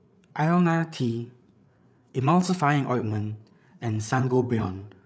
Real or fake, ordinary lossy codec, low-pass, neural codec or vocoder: fake; none; none; codec, 16 kHz, 8 kbps, FreqCodec, larger model